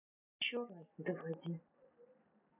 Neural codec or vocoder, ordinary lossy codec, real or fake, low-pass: none; none; real; 3.6 kHz